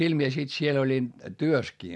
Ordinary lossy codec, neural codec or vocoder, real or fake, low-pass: none; none; real; none